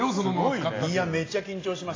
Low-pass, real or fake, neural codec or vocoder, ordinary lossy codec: 7.2 kHz; real; none; AAC, 32 kbps